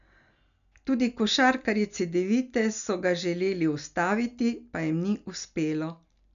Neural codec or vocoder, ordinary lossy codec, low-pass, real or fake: none; none; 7.2 kHz; real